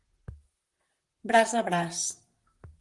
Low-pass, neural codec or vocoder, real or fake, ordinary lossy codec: 10.8 kHz; vocoder, 44.1 kHz, 128 mel bands, Pupu-Vocoder; fake; Opus, 24 kbps